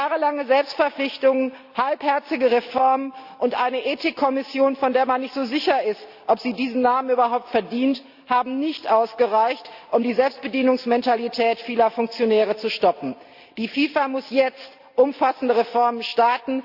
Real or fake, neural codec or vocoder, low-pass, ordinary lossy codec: real; none; 5.4 kHz; Opus, 64 kbps